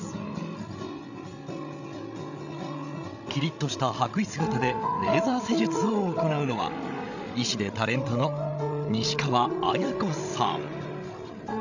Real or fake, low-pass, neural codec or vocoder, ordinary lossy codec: fake; 7.2 kHz; codec, 16 kHz, 16 kbps, FreqCodec, larger model; none